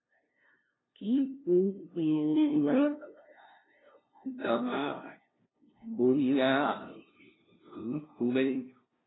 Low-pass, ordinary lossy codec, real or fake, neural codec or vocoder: 7.2 kHz; AAC, 16 kbps; fake; codec, 16 kHz, 0.5 kbps, FunCodec, trained on LibriTTS, 25 frames a second